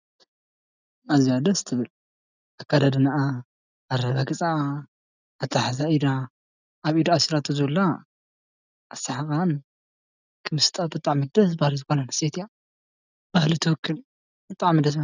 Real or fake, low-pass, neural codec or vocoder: real; 7.2 kHz; none